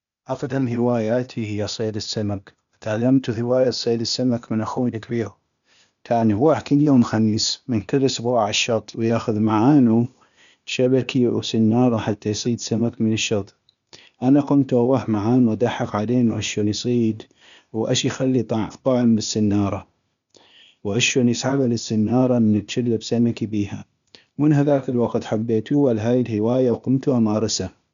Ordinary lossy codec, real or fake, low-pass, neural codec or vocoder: none; fake; 7.2 kHz; codec, 16 kHz, 0.8 kbps, ZipCodec